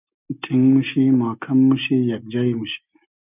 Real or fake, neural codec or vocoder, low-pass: real; none; 3.6 kHz